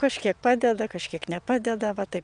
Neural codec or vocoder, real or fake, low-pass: vocoder, 22.05 kHz, 80 mel bands, WaveNeXt; fake; 9.9 kHz